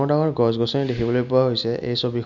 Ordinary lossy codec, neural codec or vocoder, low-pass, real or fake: none; none; 7.2 kHz; real